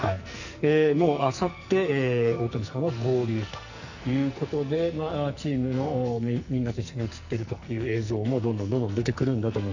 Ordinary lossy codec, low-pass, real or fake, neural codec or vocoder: none; 7.2 kHz; fake; codec, 44.1 kHz, 2.6 kbps, SNAC